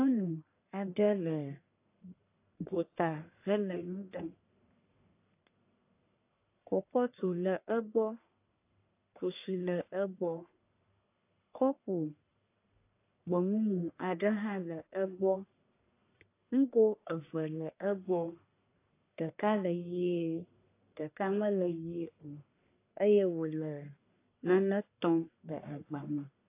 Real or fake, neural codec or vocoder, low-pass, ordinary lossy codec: fake; codec, 44.1 kHz, 1.7 kbps, Pupu-Codec; 3.6 kHz; AAC, 24 kbps